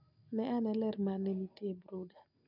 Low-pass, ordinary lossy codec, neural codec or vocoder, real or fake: 5.4 kHz; none; none; real